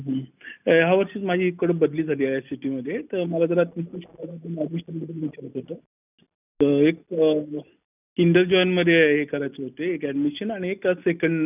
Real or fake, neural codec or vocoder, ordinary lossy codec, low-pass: real; none; none; 3.6 kHz